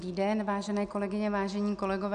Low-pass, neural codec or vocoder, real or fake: 9.9 kHz; none; real